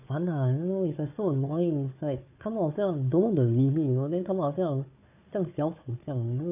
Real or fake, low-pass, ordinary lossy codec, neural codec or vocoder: fake; 3.6 kHz; AAC, 32 kbps; codec, 16 kHz, 4 kbps, FunCodec, trained on Chinese and English, 50 frames a second